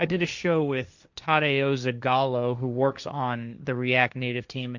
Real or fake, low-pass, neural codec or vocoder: fake; 7.2 kHz; codec, 16 kHz, 1.1 kbps, Voila-Tokenizer